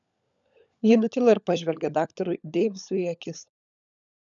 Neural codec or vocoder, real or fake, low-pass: codec, 16 kHz, 16 kbps, FunCodec, trained on LibriTTS, 50 frames a second; fake; 7.2 kHz